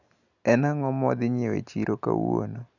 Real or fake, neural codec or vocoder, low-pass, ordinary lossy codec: real; none; 7.2 kHz; none